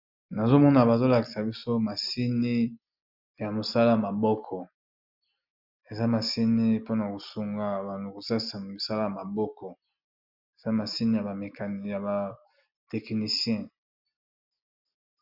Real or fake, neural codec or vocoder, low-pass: real; none; 5.4 kHz